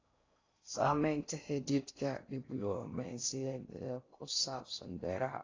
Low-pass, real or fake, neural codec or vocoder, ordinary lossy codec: 7.2 kHz; fake; codec, 16 kHz in and 24 kHz out, 0.6 kbps, FocalCodec, streaming, 4096 codes; AAC, 32 kbps